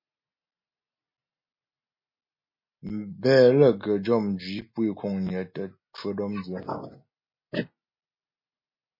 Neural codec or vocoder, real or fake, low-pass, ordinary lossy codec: none; real; 5.4 kHz; MP3, 24 kbps